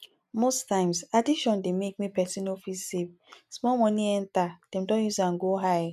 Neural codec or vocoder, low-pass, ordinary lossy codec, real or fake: none; 14.4 kHz; none; real